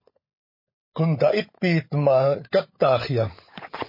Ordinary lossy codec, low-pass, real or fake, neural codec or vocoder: MP3, 24 kbps; 5.4 kHz; fake; codec, 16 kHz, 16 kbps, FunCodec, trained on LibriTTS, 50 frames a second